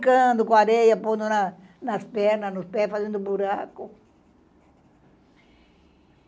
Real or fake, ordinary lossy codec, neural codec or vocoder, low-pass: real; none; none; none